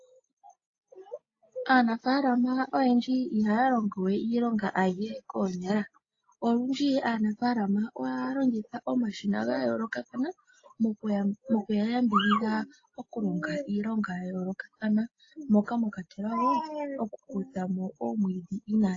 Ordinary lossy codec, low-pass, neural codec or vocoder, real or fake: AAC, 32 kbps; 7.2 kHz; none; real